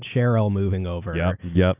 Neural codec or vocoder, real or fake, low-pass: none; real; 3.6 kHz